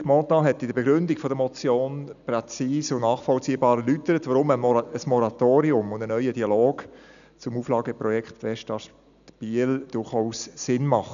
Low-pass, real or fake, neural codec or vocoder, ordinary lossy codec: 7.2 kHz; real; none; none